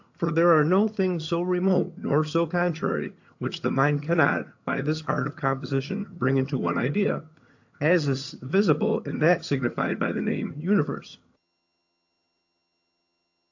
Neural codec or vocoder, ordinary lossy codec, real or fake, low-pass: vocoder, 22.05 kHz, 80 mel bands, HiFi-GAN; AAC, 48 kbps; fake; 7.2 kHz